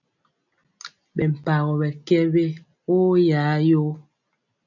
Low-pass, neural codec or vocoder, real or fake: 7.2 kHz; none; real